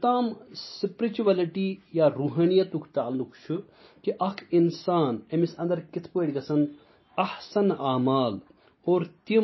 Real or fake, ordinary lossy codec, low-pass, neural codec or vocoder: real; MP3, 24 kbps; 7.2 kHz; none